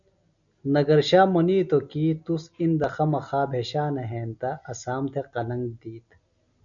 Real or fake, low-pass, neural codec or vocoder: real; 7.2 kHz; none